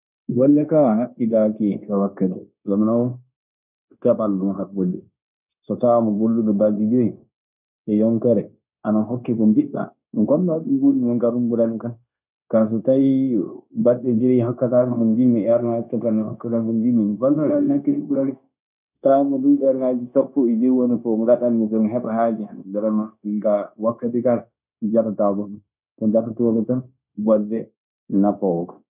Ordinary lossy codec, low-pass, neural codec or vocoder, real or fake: none; 3.6 kHz; codec, 16 kHz, 0.9 kbps, LongCat-Audio-Codec; fake